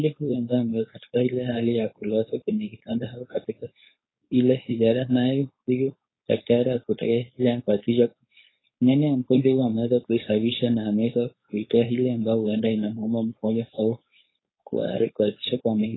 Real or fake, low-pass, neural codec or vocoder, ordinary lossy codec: fake; 7.2 kHz; codec, 16 kHz, 4.8 kbps, FACodec; AAC, 16 kbps